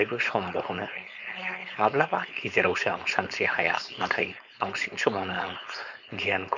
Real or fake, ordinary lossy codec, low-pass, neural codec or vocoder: fake; none; 7.2 kHz; codec, 16 kHz, 4.8 kbps, FACodec